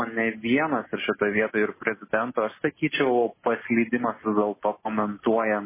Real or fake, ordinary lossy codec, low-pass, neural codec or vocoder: real; MP3, 16 kbps; 3.6 kHz; none